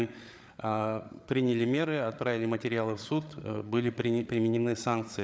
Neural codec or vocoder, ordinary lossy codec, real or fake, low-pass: codec, 16 kHz, 8 kbps, FreqCodec, larger model; none; fake; none